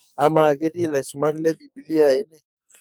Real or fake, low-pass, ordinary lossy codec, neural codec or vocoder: fake; none; none; codec, 44.1 kHz, 2.6 kbps, SNAC